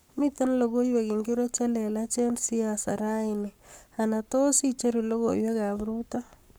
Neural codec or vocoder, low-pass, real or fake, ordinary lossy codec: codec, 44.1 kHz, 7.8 kbps, Pupu-Codec; none; fake; none